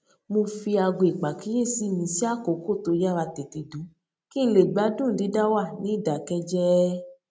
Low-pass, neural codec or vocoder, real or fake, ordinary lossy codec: none; none; real; none